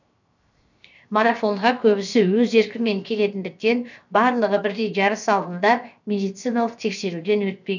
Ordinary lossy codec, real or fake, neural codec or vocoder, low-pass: none; fake; codec, 16 kHz, 0.7 kbps, FocalCodec; 7.2 kHz